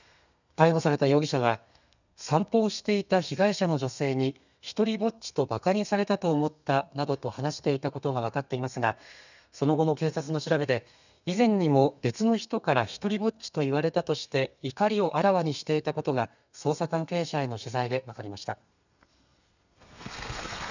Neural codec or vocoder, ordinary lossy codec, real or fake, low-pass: codec, 32 kHz, 1.9 kbps, SNAC; none; fake; 7.2 kHz